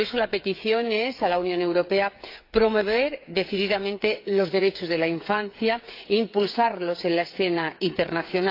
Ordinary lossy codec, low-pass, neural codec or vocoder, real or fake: AAC, 32 kbps; 5.4 kHz; codec, 16 kHz, 8 kbps, FreqCodec, smaller model; fake